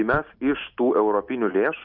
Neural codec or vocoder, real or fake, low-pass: none; real; 5.4 kHz